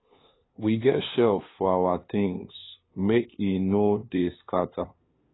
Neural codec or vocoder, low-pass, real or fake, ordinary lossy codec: codec, 16 kHz, 2 kbps, FunCodec, trained on LibriTTS, 25 frames a second; 7.2 kHz; fake; AAC, 16 kbps